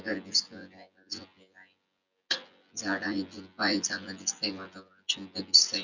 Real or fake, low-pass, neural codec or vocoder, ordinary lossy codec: fake; 7.2 kHz; vocoder, 24 kHz, 100 mel bands, Vocos; none